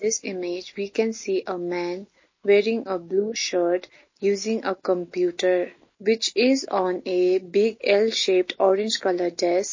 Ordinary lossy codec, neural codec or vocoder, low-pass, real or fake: MP3, 32 kbps; none; 7.2 kHz; real